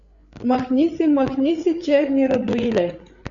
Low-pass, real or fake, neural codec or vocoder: 7.2 kHz; fake; codec, 16 kHz, 8 kbps, FreqCodec, larger model